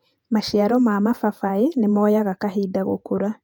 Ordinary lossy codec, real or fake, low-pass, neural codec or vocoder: none; fake; 19.8 kHz; vocoder, 48 kHz, 128 mel bands, Vocos